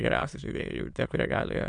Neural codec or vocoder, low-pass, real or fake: autoencoder, 22.05 kHz, a latent of 192 numbers a frame, VITS, trained on many speakers; 9.9 kHz; fake